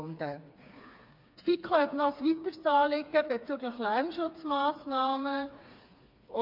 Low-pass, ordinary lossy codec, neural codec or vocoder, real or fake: 5.4 kHz; MP3, 48 kbps; codec, 16 kHz, 4 kbps, FreqCodec, smaller model; fake